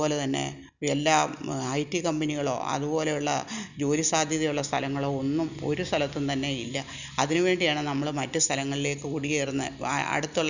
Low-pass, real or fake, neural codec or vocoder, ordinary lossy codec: 7.2 kHz; real; none; none